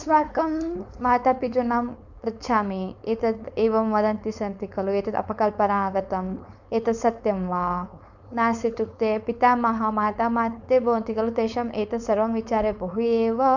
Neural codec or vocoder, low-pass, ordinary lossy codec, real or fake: codec, 16 kHz, 4.8 kbps, FACodec; 7.2 kHz; none; fake